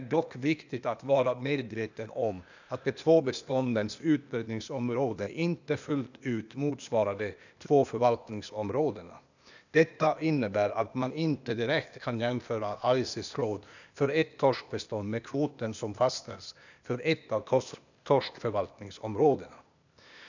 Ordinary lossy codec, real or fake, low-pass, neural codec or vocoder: none; fake; 7.2 kHz; codec, 16 kHz, 0.8 kbps, ZipCodec